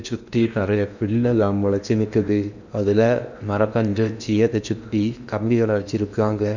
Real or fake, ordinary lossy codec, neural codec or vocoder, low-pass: fake; none; codec, 16 kHz in and 24 kHz out, 0.8 kbps, FocalCodec, streaming, 65536 codes; 7.2 kHz